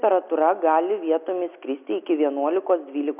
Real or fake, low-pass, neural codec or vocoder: real; 3.6 kHz; none